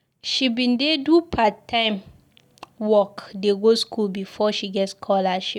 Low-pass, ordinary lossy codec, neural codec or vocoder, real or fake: 19.8 kHz; none; none; real